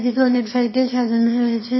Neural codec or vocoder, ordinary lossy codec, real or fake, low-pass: autoencoder, 22.05 kHz, a latent of 192 numbers a frame, VITS, trained on one speaker; MP3, 24 kbps; fake; 7.2 kHz